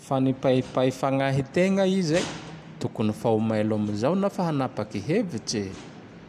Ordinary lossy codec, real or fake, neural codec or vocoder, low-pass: none; real; none; 14.4 kHz